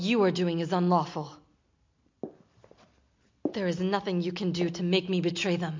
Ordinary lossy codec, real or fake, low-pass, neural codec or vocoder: MP3, 48 kbps; real; 7.2 kHz; none